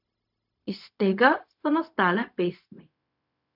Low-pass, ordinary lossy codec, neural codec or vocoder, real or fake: 5.4 kHz; none; codec, 16 kHz, 0.4 kbps, LongCat-Audio-Codec; fake